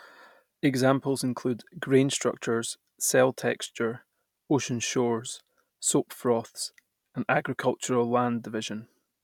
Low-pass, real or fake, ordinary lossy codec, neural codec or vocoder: 19.8 kHz; real; none; none